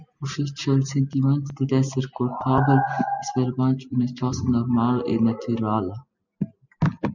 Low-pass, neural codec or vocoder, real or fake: 7.2 kHz; none; real